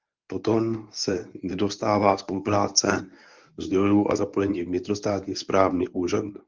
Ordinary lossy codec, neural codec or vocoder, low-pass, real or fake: Opus, 32 kbps; codec, 24 kHz, 0.9 kbps, WavTokenizer, medium speech release version 1; 7.2 kHz; fake